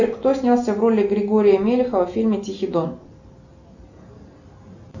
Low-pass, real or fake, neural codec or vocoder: 7.2 kHz; real; none